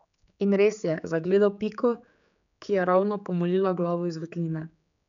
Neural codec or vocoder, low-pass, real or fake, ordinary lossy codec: codec, 16 kHz, 4 kbps, X-Codec, HuBERT features, trained on general audio; 7.2 kHz; fake; none